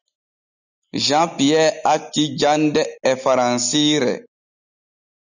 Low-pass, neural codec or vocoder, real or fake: 7.2 kHz; none; real